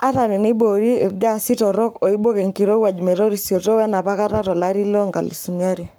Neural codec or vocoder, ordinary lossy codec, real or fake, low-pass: codec, 44.1 kHz, 7.8 kbps, Pupu-Codec; none; fake; none